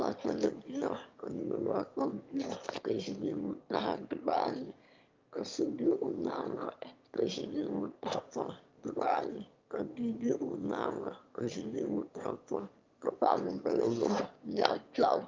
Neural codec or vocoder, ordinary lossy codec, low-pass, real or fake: autoencoder, 22.05 kHz, a latent of 192 numbers a frame, VITS, trained on one speaker; Opus, 24 kbps; 7.2 kHz; fake